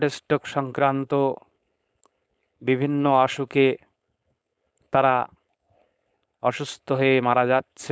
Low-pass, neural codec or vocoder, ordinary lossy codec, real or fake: none; codec, 16 kHz, 4.8 kbps, FACodec; none; fake